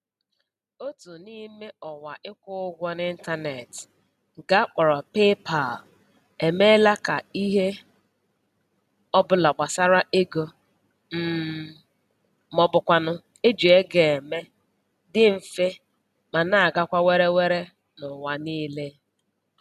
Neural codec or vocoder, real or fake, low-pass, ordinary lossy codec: none; real; 14.4 kHz; none